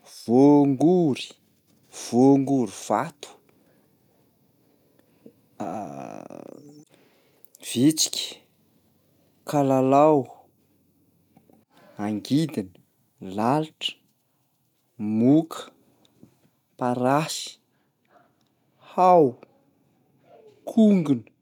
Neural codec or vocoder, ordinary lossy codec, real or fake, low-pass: none; none; real; 19.8 kHz